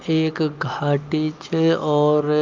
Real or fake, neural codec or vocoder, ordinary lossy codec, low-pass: real; none; Opus, 24 kbps; 7.2 kHz